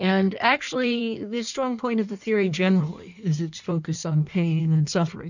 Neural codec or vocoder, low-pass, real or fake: codec, 16 kHz in and 24 kHz out, 1.1 kbps, FireRedTTS-2 codec; 7.2 kHz; fake